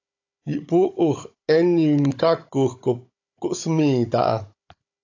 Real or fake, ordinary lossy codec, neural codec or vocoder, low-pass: fake; AAC, 48 kbps; codec, 16 kHz, 16 kbps, FunCodec, trained on Chinese and English, 50 frames a second; 7.2 kHz